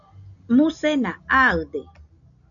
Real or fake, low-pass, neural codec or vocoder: real; 7.2 kHz; none